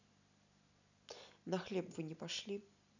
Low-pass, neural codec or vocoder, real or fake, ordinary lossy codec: 7.2 kHz; none; real; none